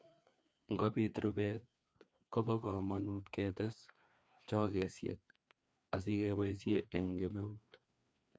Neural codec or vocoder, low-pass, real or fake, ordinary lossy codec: codec, 16 kHz, 2 kbps, FreqCodec, larger model; none; fake; none